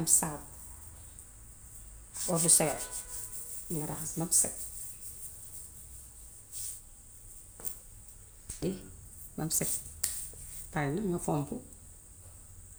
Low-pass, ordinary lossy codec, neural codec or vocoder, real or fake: none; none; none; real